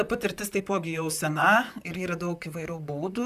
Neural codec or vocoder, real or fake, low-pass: vocoder, 44.1 kHz, 128 mel bands, Pupu-Vocoder; fake; 14.4 kHz